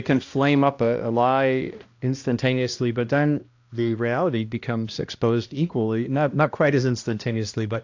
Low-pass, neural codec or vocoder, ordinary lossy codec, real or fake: 7.2 kHz; codec, 16 kHz, 1 kbps, X-Codec, HuBERT features, trained on balanced general audio; AAC, 48 kbps; fake